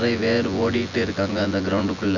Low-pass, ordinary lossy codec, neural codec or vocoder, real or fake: 7.2 kHz; none; vocoder, 24 kHz, 100 mel bands, Vocos; fake